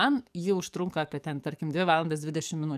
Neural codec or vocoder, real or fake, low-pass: codec, 44.1 kHz, 7.8 kbps, DAC; fake; 14.4 kHz